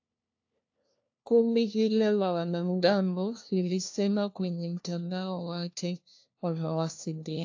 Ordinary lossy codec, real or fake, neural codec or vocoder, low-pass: AAC, 48 kbps; fake; codec, 16 kHz, 1 kbps, FunCodec, trained on LibriTTS, 50 frames a second; 7.2 kHz